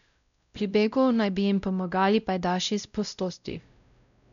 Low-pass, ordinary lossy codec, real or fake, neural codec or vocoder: 7.2 kHz; none; fake; codec, 16 kHz, 0.5 kbps, X-Codec, WavLM features, trained on Multilingual LibriSpeech